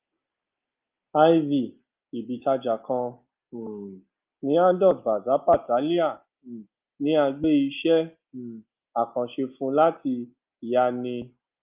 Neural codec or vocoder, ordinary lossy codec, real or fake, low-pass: none; Opus, 24 kbps; real; 3.6 kHz